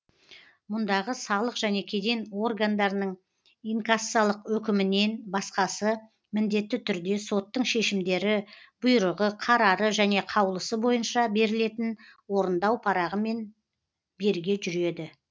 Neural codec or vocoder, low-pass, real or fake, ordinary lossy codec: none; none; real; none